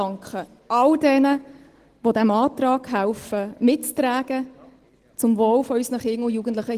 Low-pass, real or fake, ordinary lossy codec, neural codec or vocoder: 14.4 kHz; real; Opus, 16 kbps; none